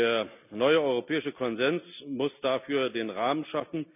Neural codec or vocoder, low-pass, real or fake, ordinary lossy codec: none; 3.6 kHz; real; none